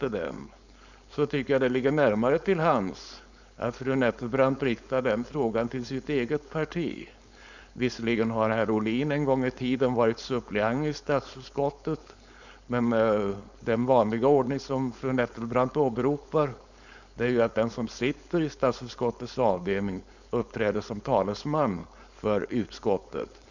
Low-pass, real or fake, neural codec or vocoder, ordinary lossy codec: 7.2 kHz; fake; codec, 16 kHz, 4.8 kbps, FACodec; none